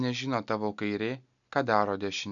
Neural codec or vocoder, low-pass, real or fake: none; 7.2 kHz; real